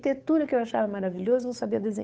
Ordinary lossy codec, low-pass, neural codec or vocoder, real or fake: none; none; codec, 16 kHz, 8 kbps, FunCodec, trained on Chinese and English, 25 frames a second; fake